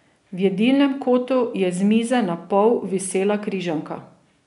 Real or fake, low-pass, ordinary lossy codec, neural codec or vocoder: real; 10.8 kHz; none; none